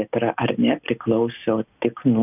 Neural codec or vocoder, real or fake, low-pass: none; real; 3.6 kHz